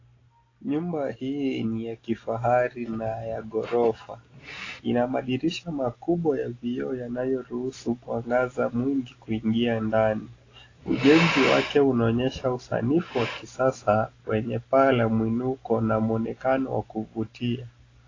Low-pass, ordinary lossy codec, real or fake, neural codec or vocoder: 7.2 kHz; AAC, 32 kbps; real; none